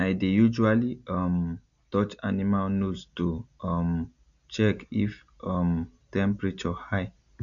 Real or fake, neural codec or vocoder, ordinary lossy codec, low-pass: real; none; none; 7.2 kHz